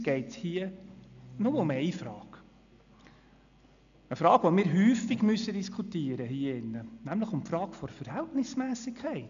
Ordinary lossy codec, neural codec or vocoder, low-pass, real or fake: none; none; 7.2 kHz; real